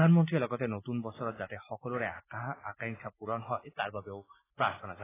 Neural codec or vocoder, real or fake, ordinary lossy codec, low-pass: none; real; AAC, 16 kbps; 3.6 kHz